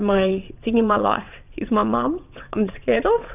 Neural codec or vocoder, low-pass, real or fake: vocoder, 22.05 kHz, 80 mel bands, WaveNeXt; 3.6 kHz; fake